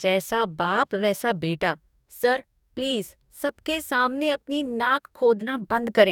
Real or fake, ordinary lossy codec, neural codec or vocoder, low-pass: fake; none; codec, 44.1 kHz, 2.6 kbps, DAC; 19.8 kHz